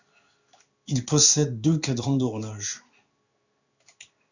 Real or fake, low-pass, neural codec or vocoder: fake; 7.2 kHz; codec, 16 kHz in and 24 kHz out, 1 kbps, XY-Tokenizer